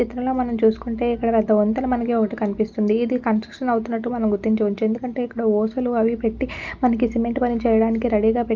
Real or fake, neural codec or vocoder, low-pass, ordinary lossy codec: real; none; 7.2 kHz; Opus, 24 kbps